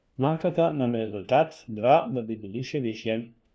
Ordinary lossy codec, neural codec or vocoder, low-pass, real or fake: none; codec, 16 kHz, 1 kbps, FunCodec, trained on LibriTTS, 50 frames a second; none; fake